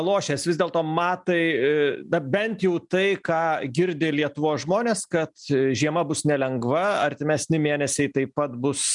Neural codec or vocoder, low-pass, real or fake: none; 10.8 kHz; real